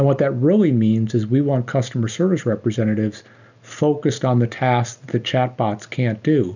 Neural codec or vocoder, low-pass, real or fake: none; 7.2 kHz; real